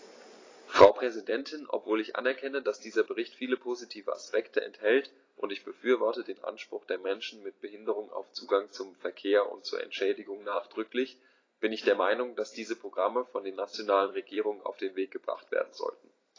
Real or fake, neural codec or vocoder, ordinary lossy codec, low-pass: real; none; AAC, 32 kbps; 7.2 kHz